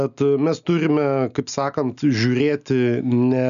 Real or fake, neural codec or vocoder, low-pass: real; none; 7.2 kHz